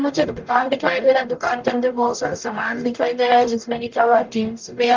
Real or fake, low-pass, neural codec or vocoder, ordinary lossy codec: fake; 7.2 kHz; codec, 44.1 kHz, 0.9 kbps, DAC; Opus, 24 kbps